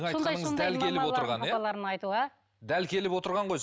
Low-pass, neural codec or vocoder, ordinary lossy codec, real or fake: none; none; none; real